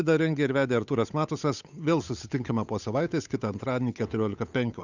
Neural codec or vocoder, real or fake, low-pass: codec, 16 kHz, 8 kbps, FunCodec, trained on Chinese and English, 25 frames a second; fake; 7.2 kHz